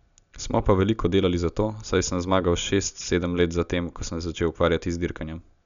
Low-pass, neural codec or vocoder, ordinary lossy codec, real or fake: 7.2 kHz; none; none; real